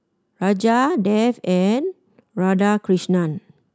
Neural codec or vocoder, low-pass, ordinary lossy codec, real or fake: none; none; none; real